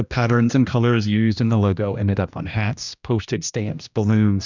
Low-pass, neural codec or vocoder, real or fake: 7.2 kHz; codec, 16 kHz, 1 kbps, X-Codec, HuBERT features, trained on general audio; fake